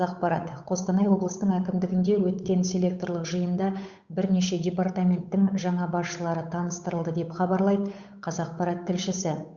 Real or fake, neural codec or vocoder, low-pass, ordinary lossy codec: fake; codec, 16 kHz, 8 kbps, FunCodec, trained on Chinese and English, 25 frames a second; 7.2 kHz; Opus, 64 kbps